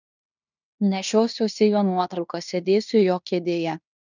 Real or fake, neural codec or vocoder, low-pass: fake; codec, 16 kHz in and 24 kHz out, 0.9 kbps, LongCat-Audio-Codec, fine tuned four codebook decoder; 7.2 kHz